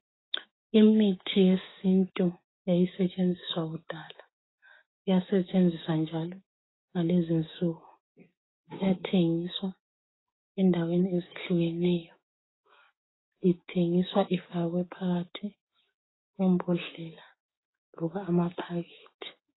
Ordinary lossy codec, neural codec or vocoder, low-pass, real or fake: AAC, 16 kbps; codec, 16 kHz, 6 kbps, DAC; 7.2 kHz; fake